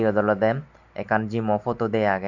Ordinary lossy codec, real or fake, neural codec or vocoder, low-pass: none; real; none; 7.2 kHz